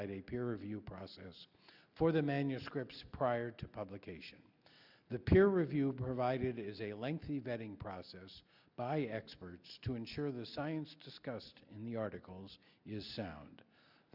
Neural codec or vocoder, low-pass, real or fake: none; 5.4 kHz; real